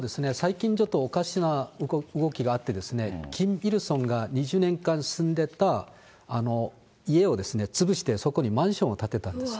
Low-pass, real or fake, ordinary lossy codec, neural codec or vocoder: none; real; none; none